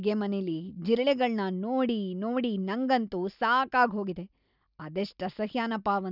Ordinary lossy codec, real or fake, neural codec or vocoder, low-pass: none; real; none; 5.4 kHz